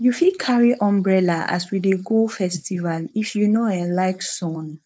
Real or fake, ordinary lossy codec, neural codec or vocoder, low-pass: fake; none; codec, 16 kHz, 4.8 kbps, FACodec; none